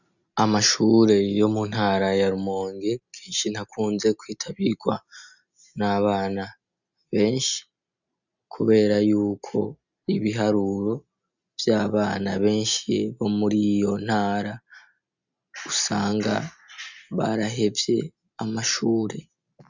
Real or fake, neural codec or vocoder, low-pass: real; none; 7.2 kHz